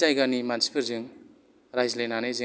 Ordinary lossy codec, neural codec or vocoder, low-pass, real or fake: none; none; none; real